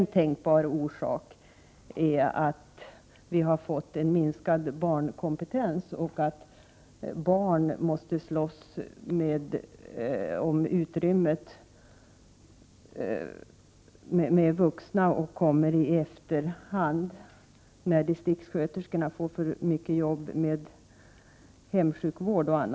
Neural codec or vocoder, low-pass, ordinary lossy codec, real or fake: none; none; none; real